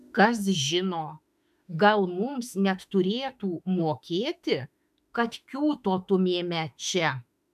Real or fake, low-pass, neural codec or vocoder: fake; 14.4 kHz; autoencoder, 48 kHz, 32 numbers a frame, DAC-VAE, trained on Japanese speech